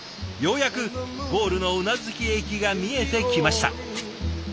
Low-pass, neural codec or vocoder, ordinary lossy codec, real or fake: none; none; none; real